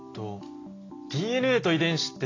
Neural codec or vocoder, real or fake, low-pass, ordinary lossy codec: none; real; 7.2 kHz; AAC, 32 kbps